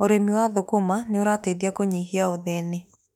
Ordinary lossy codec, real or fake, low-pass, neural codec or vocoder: none; fake; 19.8 kHz; autoencoder, 48 kHz, 32 numbers a frame, DAC-VAE, trained on Japanese speech